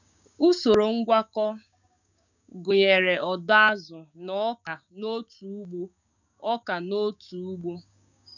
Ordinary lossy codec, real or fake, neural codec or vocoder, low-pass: none; fake; codec, 16 kHz, 6 kbps, DAC; 7.2 kHz